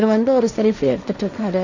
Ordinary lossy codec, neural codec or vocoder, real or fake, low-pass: none; codec, 16 kHz, 1.1 kbps, Voila-Tokenizer; fake; 7.2 kHz